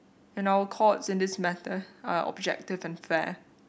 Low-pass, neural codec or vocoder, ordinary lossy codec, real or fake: none; none; none; real